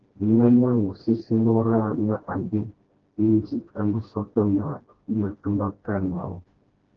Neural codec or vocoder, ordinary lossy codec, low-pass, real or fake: codec, 16 kHz, 1 kbps, FreqCodec, smaller model; Opus, 16 kbps; 7.2 kHz; fake